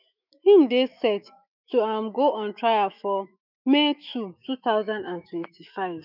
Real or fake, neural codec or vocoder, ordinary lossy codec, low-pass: fake; autoencoder, 48 kHz, 128 numbers a frame, DAC-VAE, trained on Japanese speech; none; 5.4 kHz